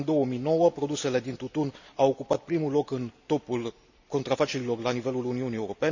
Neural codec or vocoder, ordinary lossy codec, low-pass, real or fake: none; none; 7.2 kHz; real